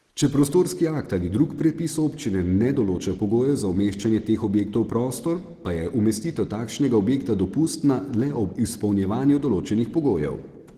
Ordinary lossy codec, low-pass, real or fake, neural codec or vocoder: Opus, 16 kbps; 14.4 kHz; real; none